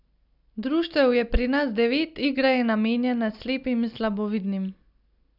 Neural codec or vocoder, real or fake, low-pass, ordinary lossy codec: none; real; 5.4 kHz; none